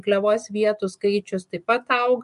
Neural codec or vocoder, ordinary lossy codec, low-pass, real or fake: none; MP3, 64 kbps; 10.8 kHz; real